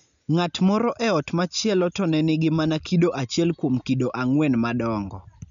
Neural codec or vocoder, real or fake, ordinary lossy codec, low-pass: none; real; none; 7.2 kHz